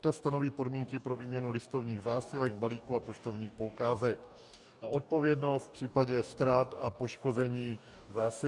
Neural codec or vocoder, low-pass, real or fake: codec, 44.1 kHz, 2.6 kbps, DAC; 10.8 kHz; fake